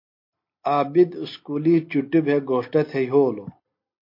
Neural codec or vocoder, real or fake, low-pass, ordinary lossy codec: none; real; 5.4 kHz; MP3, 32 kbps